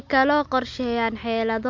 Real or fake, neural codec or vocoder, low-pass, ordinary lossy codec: real; none; 7.2 kHz; MP3, 48 kbps